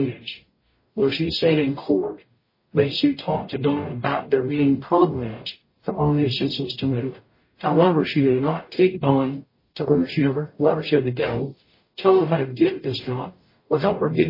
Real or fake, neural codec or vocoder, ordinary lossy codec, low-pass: fake; codec, 44.1 kHz, 0.9 kbps, DAC; MP3, 24 kbps; 5.4 kHz